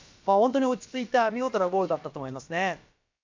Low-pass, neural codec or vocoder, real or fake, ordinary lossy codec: 7.2 kHz; codec, 16 kHz, about 1 kbps, DyCAST, with the encoder's durations; fake; MP3, 48 kbps